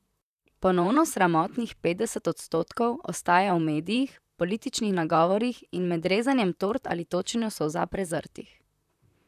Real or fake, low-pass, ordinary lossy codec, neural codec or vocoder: fake; 14.4 kHz; none; vocoder, 44.1 kHz, 128 mel bands, Pupu-Vocoder